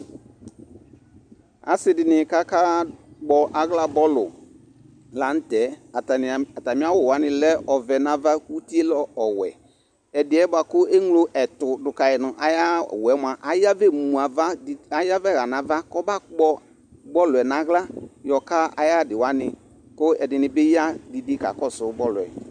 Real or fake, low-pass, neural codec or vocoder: real; 9.9 kHz; none